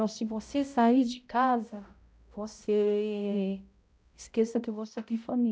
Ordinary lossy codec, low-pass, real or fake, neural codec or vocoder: none; none; fake; codec, 16 kHz, 0.5 kbps, X-Codec, HuBERT features, trained on balanced general audio